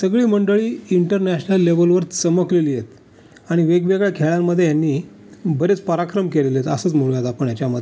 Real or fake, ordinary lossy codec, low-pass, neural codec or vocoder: real; none; none; none